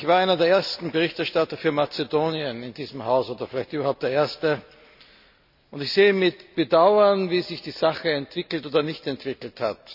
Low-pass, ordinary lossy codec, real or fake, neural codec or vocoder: 5.4 kHz; none; real; none